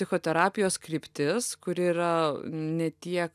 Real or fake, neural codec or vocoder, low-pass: real; none; 14.4 kHz